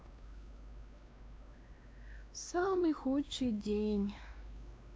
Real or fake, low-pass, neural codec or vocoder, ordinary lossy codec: fake; none; codec, 16 kHz, 2 kbps, X-Codec, WavLM features, trained on Multilingual LibriSpeech; none